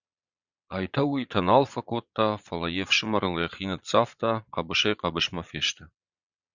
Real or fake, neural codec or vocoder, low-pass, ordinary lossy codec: fake; vocoder, 22.05 kHz, 80 mel bands, Vocos; 7.2 kHz; Opus, 64 kbps